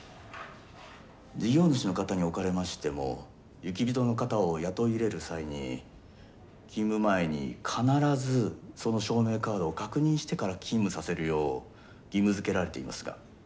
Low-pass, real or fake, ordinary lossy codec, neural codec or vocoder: none; real; none; none